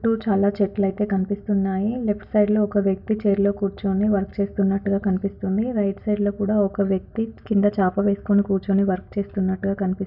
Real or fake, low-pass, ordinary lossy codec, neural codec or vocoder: real; 5.4 kHz; none; none